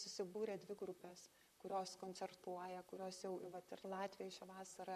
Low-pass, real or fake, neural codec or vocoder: 14.4 kHz; fake; vocoder, 44.1 kHz, 128 mel bands, Pupu-Vocoder